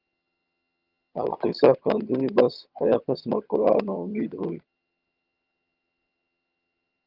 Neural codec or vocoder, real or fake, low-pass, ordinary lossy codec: vocoder, 22.05 kHz, 80 mel bands, HiFi-GAN; fake; 5.4 kHz; Opus, 32 kbps